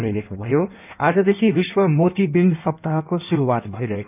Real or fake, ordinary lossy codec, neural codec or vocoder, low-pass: fake; none; codec, 16 kHz in and 24 kHz out, 1.1 kbps, FireRedTTS-2 codec; 3.6 kHz